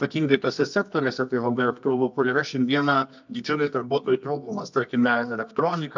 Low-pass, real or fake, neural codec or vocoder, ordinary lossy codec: 7.2 kHz; fake; codec, 24 kHz, 0.9 kbps, WavTokenizer, medium music audio release; MP3, 64 kbps